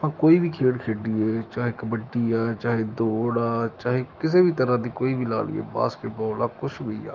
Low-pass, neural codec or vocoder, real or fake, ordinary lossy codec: 7.2 kHz; autoencoder, 48 kHz, 128 numbers a frame, DAC-VAE, trained on Japanese speech; fake; Opus, 32 kbps